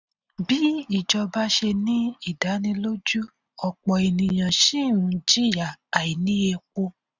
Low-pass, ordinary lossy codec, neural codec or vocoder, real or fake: 7.2 kHz; none; none; real